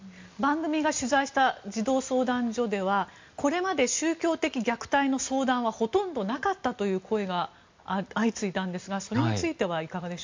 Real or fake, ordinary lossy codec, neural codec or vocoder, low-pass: real; MP3, 64 kbps; none; 7.2 kHz